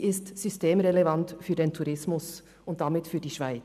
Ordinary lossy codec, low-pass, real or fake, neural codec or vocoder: none; 14.4 kHz; real; none